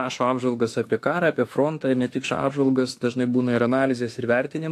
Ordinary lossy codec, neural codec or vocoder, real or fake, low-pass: AAC, 64 kbps; autoencoder, 48 kHz, 32 numbers a frame, DAC-VAE, trained on Japanese speech; fake; 14.4 kHz